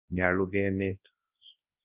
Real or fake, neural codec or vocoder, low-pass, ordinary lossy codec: fake; codec, 24 kHz, 0.9 kbps, WavTokenizer, large speech release; 3.6 kHz; Opus, 24 kbps